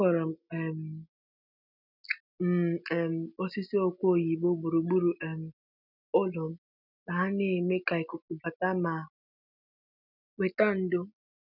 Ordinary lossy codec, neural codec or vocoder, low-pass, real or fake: none; none; 5.4 kHz; real